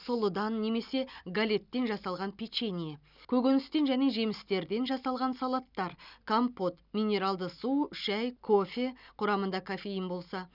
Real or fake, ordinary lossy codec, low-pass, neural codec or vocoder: real; none; 5.4 kHz; none